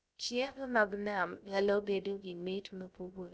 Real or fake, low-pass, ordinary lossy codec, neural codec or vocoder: fake; none; none; codec, 16 kHz, 0.3 kbps, FocalCodec